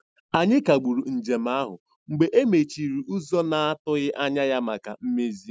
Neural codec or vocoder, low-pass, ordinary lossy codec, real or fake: none; none; none; real